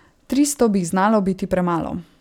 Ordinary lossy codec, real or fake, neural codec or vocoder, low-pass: none; real; none; 19.8 kHz